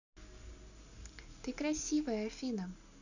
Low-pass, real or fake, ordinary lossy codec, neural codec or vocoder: 7.2 kHz; real; none; none